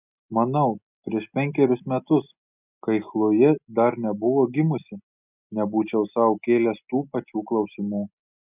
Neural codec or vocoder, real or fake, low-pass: none; real; 3.6 kHz